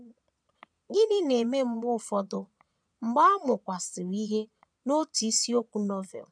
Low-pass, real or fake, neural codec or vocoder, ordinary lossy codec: 9.9 kHz; fake; vocoder, 22.05 kHz, 80 mel bands, Vocos; none